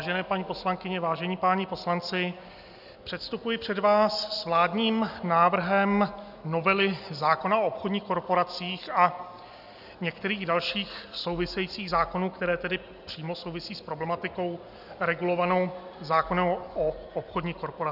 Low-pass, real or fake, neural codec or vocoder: 5.4 kHz; real; none